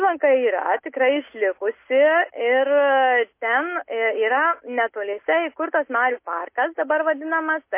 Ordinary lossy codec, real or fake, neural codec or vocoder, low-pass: MP3, 24 kbps; real; none; 3.6 kHz